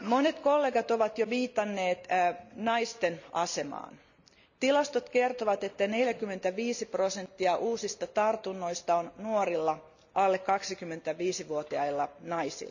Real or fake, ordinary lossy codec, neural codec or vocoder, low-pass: real; none; none; 7.2 kHz